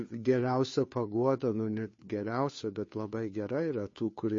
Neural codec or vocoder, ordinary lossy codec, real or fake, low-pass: codec, 16 kHz, 2 kbps, FunCodec, trained on Chinese and English, 25 frames a second; MP3, 32 kbps; fake; 7.2 kHz